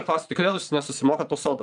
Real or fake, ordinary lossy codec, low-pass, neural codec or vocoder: fake; MP3, 96 kbps; 9.9 kHz; vocoder, 22.05 kHz, 80 mel bands, Vocos